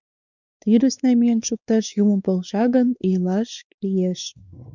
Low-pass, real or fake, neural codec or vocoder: 7.2 kHz; fake; codec, 16 kHz, 4 kbps, X-Codec, WavLM features, trained on Multilingual LibriSpeech